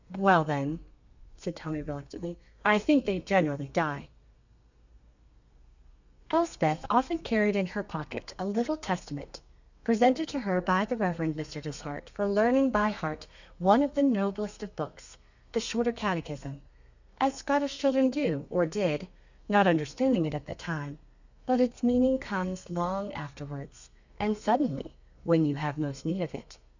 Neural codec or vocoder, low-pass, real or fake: codec, 32 kHz, 1.9 kbps, SNAC; 7.2 kHz; fake